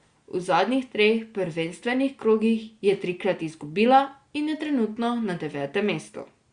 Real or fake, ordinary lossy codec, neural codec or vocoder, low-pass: real; Opus, 64 kbps; none; 9.9 kHz